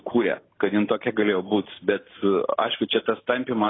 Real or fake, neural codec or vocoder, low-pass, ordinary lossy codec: real; none; 7.2 kHz; AAC, 16 kbps